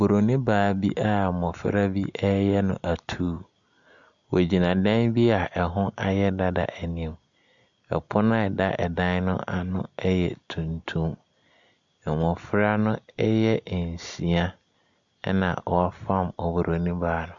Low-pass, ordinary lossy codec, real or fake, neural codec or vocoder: 7.2 kHz; MP3, 96 kbps; real; none